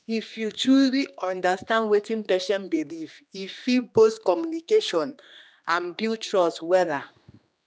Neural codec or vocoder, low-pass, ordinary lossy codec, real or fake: codec, 16 kHz, 2 kbps, X-Codec, HuBERT features, trained on general audio; none; none; fake